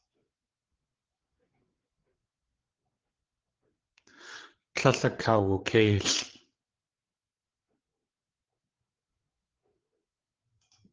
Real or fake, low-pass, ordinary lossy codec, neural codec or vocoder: fake; 7.2 kHz; Opus, 16 kbps; codec, 16 kHz, 8 kbps, FreqCodec, larger model